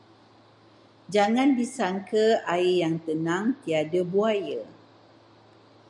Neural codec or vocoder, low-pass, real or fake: none; 10.8 kHz; real